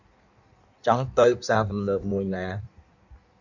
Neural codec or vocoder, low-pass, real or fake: codec, 16 kHz in and 24 kHz out, 1.1 kbps, FireRedTTS-2 codec; 7.2 kHz; fake